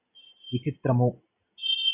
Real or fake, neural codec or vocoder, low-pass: real; none; 3.6 kHz